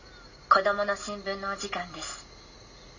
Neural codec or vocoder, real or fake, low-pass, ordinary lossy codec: none; real; 7.2 kHz; none